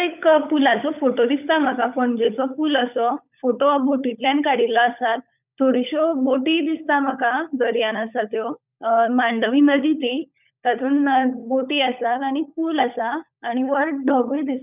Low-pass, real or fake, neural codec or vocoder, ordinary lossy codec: 3.6 kHz; fake; codec, 16 kHz, 16 kbps, FunCodec, trained on LibriTTS, 50 frames a second; none